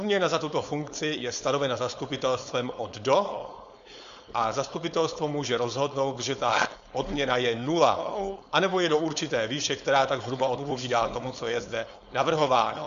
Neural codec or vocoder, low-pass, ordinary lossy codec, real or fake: codec, 16 kHz, 4.8 kbps, FACodec; 7.2 kHz; Opus, 64 kbps; fake